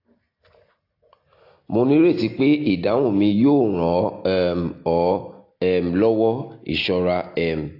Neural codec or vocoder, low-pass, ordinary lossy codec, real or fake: none; 5.4 kHz; AAC, 32 kbps; real